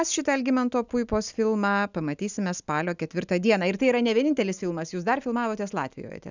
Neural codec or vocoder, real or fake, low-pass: none; real; 7.2 kHz